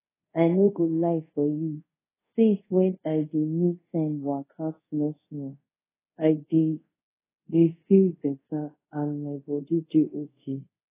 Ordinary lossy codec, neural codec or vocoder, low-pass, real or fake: AAC, 16 kbps; codec, 24 kHz, 0.5 kbps, DualCodec; 3.6 kHz; fake